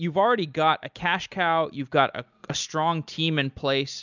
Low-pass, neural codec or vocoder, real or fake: 7.2 kHz; none; real